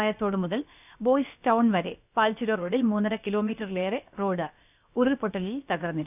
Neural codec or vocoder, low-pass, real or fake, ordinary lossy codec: codec, 16 kHz, about 1 kbps, DyCAST, with the encoder's durations; 3.6 kHz; fake; none